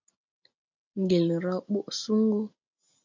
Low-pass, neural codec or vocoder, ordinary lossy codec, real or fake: 7.2 kHz; none; MP3, 64 kbps; real